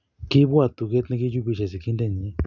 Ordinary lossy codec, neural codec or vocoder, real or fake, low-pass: none; none; real; 7.2 kHz